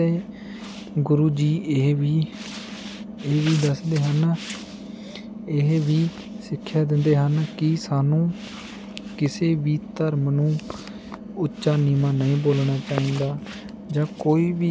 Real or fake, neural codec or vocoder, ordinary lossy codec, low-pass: real; none; none; none